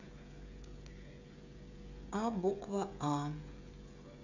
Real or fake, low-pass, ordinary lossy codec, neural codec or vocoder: fake; 7.2 kHz; none; codec, 16 kHz, 16 kbps, FreqCodec, smaller model